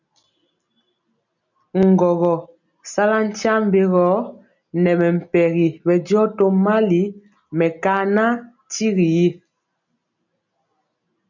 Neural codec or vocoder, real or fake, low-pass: none; real; 7.2 kHz